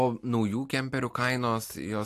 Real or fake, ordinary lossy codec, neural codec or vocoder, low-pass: real; AAC, 64 kbps; none; 14.4 kHz